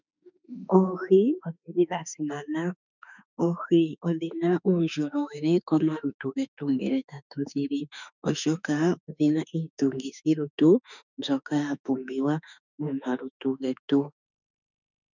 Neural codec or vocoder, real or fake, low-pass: autoencoder, 48 kHz, 32 numbers a frame, DAC-VAE, trained on Japanese speech; fake; 7.2 kHz